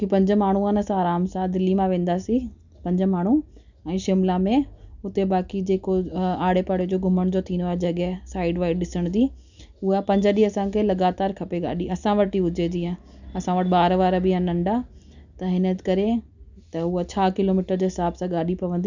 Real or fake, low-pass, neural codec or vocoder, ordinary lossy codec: real; 7.2 kHz; none; none